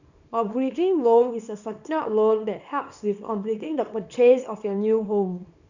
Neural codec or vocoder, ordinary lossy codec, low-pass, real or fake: codec, 24 kHz, 0.9 kbps, WavTokenizer, small release; none; 7.2 kHz; fake